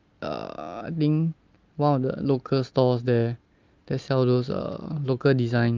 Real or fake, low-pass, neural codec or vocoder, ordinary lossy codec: real; 7.2 kHz; none; Opus, 24 kbps